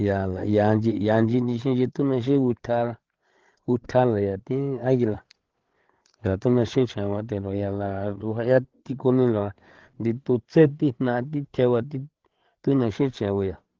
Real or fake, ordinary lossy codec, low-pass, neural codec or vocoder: fake; Opus, 16 kbps; 7.2 kHz; codec, 16 kHz, 8 kbps, FreqCodec, larger model